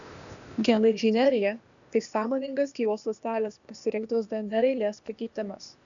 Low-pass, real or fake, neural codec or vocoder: 7.2 kHz; fake; codec, 16 kHz, 0.8 kbps, ZipCodec